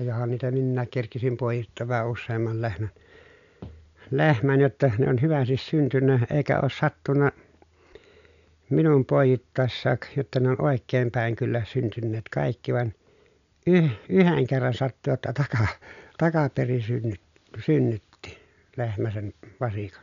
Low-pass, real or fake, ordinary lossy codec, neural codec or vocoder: 7.2 kHz; real; none; none